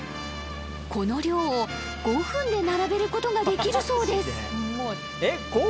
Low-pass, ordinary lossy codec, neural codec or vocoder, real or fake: none; none; none; real